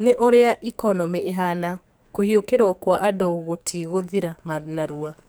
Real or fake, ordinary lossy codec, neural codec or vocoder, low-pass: fake; none; codec, 44.1 kHz, 2.6 kbps, SNAC; none